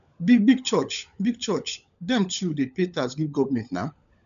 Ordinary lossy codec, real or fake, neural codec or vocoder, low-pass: none; fake; codec, 16 kHz, 16 kbps, FunCodec, trained on LibriTTS, 50 frames a second; 7.2 kHz